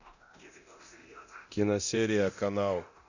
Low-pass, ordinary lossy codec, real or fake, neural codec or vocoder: 7.2 kHz; none; fake; codec, 24 kHz, 0.9 kbps, DualCodec